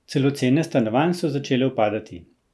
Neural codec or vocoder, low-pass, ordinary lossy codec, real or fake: none; none; none; real